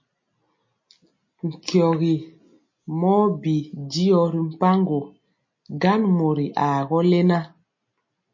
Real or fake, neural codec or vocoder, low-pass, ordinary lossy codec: real; none; 7.2 kHz; MP3, 32 kbps